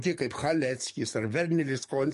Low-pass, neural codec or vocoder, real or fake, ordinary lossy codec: 14.4 kHz; codec, 44.1 kHz, 7.8 kbps, DAC; fake; MP3, 48 kbps